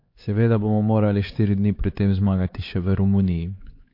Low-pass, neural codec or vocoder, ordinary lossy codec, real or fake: 5.4 kHz; codec, 16 kHz, 4 kbps, X-Codec, HuBERT features, trained on LibriSpeech; MP3, 32 kbps; fake